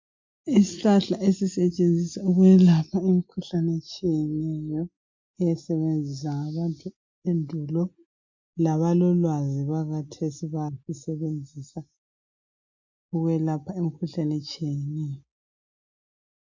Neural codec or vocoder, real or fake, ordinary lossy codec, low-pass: none; real; MP3, 48 kbps; 7.2 kHz